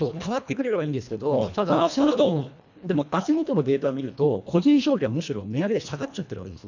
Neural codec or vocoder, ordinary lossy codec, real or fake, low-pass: codec, 24 kHz, 1.5 kbps, HILCodec; none; fake; 7.2 kHz